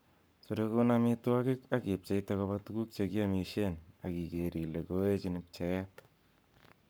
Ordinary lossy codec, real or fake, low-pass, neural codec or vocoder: none; fake; none; codec, 44.1 kHz, 7.8 kbps, Pupu-Codec